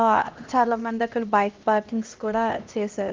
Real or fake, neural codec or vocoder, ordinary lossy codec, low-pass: fake; codec, 16 kHz, 1 kbps, X-Codec, HuBERT features, trained on LibriSpeech; Opus, 32 kbps; 7.2 kHz